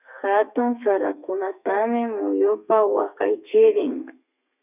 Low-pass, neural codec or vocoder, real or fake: 3.6 kHz; codec, 32 kHz, 1.9 kbps, SNAC; fake